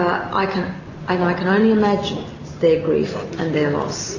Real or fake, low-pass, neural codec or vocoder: real; 7.2 kHz; none